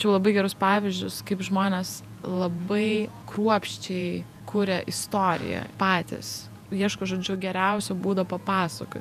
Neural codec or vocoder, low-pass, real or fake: vocoder, 48 kHz, 128 mel bands, Vocos; 14.4 kHz; fake